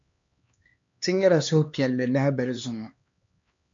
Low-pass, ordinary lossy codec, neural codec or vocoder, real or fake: 7.2 kHz; MP3, 48 kbps; codec, 16 kHz, 2 kbps, X-Codec, HuBERT features, trained on LibriSpeech; fake